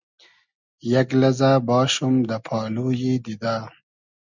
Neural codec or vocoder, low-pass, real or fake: none; 7.2 kHz; real